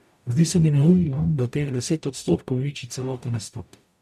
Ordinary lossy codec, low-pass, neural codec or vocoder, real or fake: none; 14.4 kHz; codec, 44.1 kHz, 0.9 kbps, DAC; fake